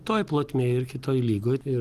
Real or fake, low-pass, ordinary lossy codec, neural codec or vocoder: real; 14.4 kHz; Opus, 24 kbps; none